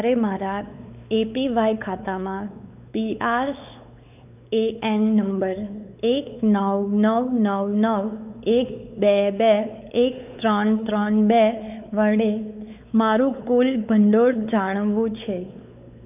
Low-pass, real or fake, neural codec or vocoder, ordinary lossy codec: 3.6 kHz; fake; codec, 16 kHz, 4 kbps, X-Codec, WavLM features, trained on Multilingual LibriSpeech; none